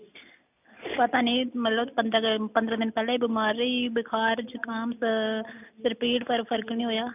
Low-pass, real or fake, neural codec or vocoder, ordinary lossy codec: 3.6 kHz; real; none; none